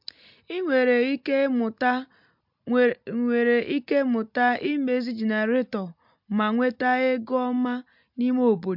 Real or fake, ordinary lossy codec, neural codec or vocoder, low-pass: real; MP3, 48 kbps; none; 5.4 kHz